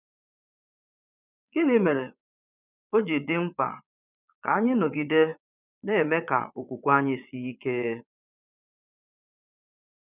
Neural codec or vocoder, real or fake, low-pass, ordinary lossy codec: vocoder, 22.05 kHz, 80 mel bands, Vocos; fake; 3.6 kHz; none